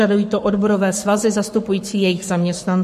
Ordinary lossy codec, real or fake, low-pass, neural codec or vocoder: MP3, 64 kbps; fake; 14.4 kHz; codec, 44.1 kHz, 7.8 kbps, Pupu-Codec